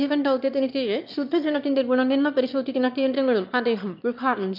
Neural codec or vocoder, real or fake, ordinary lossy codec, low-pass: autoencoder, 22.05 kHz, a latent of 192 numbers a frame, VITS, trained on one speaker; fake; MP3, 48 kbps; 5.4 kHz